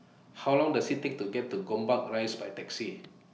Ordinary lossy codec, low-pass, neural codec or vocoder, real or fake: none; none; none; real